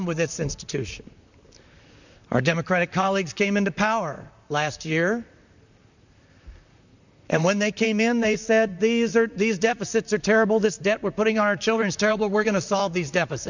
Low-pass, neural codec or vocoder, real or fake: 7.2 kHz; vocoder, 44.1 kHz, 128 mel bands, Pupu-Vocoder; fake